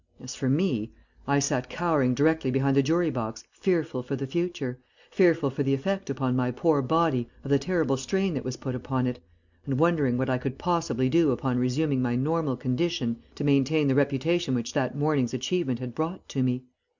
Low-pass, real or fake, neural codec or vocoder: 7.2 kHz; real; none